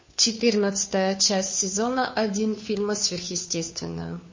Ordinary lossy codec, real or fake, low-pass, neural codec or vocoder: MP3, 32 kbps; fake; 7.2 kHz; codec, 16 kHz, 2 kbps, FunCodec, trained on Chinese and English, 25 frames a second